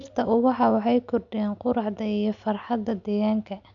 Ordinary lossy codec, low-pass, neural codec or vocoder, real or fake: none; 7.2 kHz; none; real